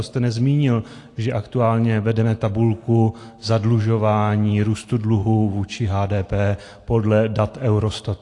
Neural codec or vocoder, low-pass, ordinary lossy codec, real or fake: none; 10.8 kHz; AAC, 48 kbps; real